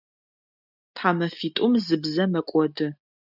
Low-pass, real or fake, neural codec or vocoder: 5.4 kHz; real; none